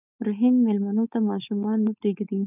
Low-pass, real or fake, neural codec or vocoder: 3.6 kHz; fake; codec, 16 kHz, 4.8 kbps, FACodec